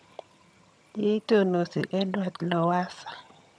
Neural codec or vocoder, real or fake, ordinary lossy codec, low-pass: vocoder, 22.05 kHz, 80 mel bands, HiFi-GAN; fake; none; none